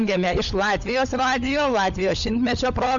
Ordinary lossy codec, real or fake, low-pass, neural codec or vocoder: Opus, 64 kbps; fake; 7.2 kHz; codec, 16 kHz, 16 kbps, FunCodec, trained on LibriTTS, 50 frames a second